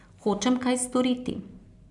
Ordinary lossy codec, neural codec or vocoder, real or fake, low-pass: none; none; real; 10.8 kHz